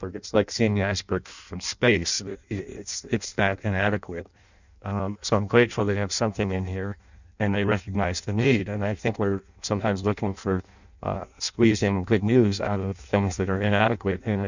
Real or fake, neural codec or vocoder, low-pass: fake; codec, 16 kHz in and 24 kHz out, 0.6 kbps, FireRedTTS-2 codec; 7.2 kHz